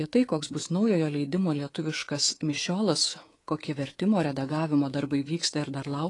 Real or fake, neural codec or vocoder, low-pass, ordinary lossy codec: fake; codec, 24 kHz, 3.1 kbps, DualCodec; 10.8 kHz; AAC, 32 kbps